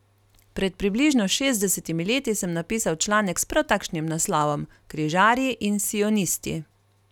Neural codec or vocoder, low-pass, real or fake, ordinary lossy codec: none; 19.8 kHz; real; none